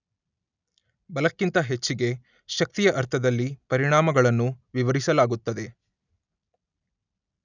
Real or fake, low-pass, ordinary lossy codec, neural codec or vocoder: real; 7.2 kHz; none; none